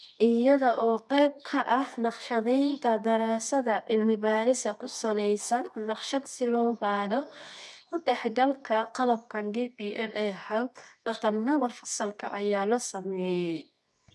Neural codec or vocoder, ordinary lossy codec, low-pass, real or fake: codec, 24 kHz, 0.9 kbps, WavTokenizer, medium music audio release; none; none; fake